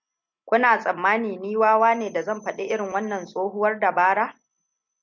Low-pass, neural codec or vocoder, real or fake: 7.2 kHz; none; real